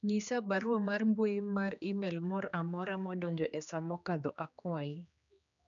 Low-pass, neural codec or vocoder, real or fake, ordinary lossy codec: 7.2 kHz; codec, 16 kHz, 2 kbps, X-Codec, HuBERT features, trained on general audio; fake; none